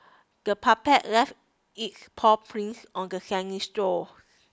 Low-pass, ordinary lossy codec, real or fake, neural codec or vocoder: none; none; real; none